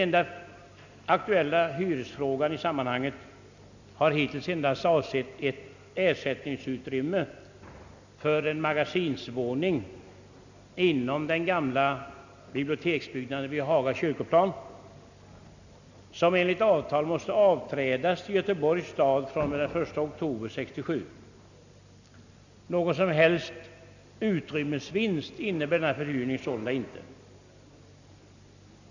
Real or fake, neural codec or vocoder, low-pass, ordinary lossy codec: real; none; 7.2 kHz; none